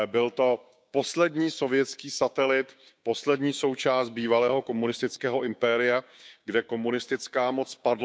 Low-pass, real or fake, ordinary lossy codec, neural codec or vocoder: none; fake; none; codec, 16 kHz, 6 kbps, DAC